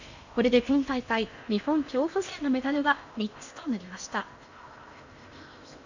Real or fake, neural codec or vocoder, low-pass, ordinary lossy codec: fake; codec, 16 kHz in and 24 kHz out, 0.8 kbps, FocalCodec, streaming, 65536 codes; 7.2 kHz; none